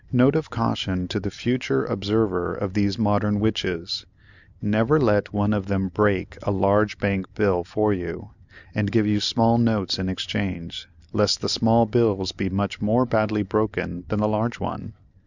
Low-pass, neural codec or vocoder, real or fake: 7.2 kHz; none; real